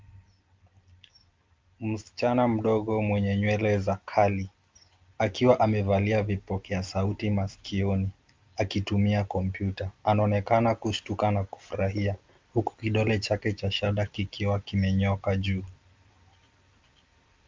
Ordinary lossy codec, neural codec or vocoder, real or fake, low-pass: Opus, 24 kbps; none; real; 7.2 kHz